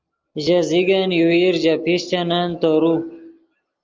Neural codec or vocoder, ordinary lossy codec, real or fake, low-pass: none; Opus, 32 kbps; real; 7.2 kHz